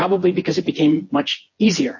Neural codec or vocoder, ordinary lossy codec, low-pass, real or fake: vocoder, 24 kHz, 100 mel bands, Vocos; MP3, 32 kbps; 7.2 kHz; fake